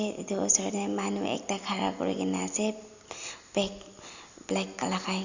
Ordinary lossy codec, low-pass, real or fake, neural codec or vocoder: Opus, 64 kbps; 7.2 kHz; real; none